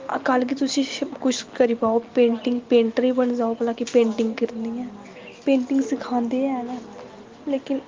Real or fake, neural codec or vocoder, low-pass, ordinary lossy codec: real; none; 7.2 kHz; Opus, 24 kbps